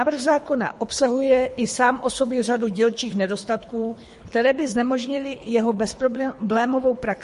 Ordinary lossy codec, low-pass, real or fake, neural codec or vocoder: MP3, 48 kbps; 10.8 kHz; fake; codec, 24 kHz, 3 kbps, HILCodec